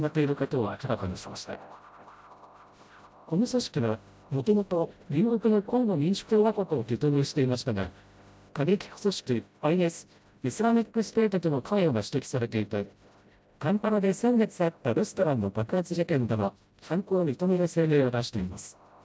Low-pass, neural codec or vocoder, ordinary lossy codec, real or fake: none; codec, 16 kHz, 0.5 kbps, FreqCodec, smaller model; none; fake